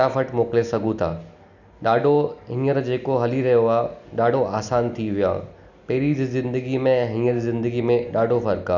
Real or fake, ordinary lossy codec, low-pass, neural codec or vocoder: real; none; 7.2 kHz; none